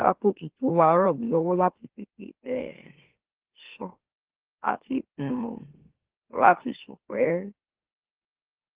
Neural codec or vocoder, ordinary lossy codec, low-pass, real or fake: autoencoder, 44.1 kHz, a latent of 192 numbers a frame, MeloTTS; Opus, 16 kbps; 3.6 kHz; fake